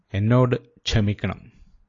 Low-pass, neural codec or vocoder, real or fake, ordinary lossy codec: 7.2 kHz; none; real; AAC, 32 kbps